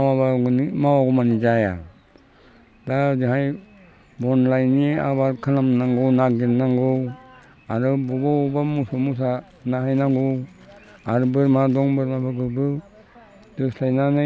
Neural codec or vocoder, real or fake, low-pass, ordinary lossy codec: none; real; none; none